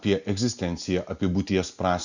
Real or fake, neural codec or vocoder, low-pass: real; none; 7.2 kHz